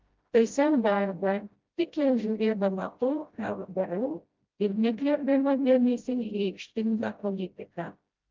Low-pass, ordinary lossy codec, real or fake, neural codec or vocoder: 7.2 kHz; Opus, 24 kbps; fake; codec, 16 kHz, 0.5 kbps, FreqCodec, smaller model